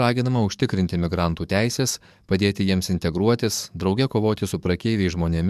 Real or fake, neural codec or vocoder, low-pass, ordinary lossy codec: fake; codec, 44.1 kHz, 7.8 kbps, DAC; 14.4 kHz; MP3, 96 kbps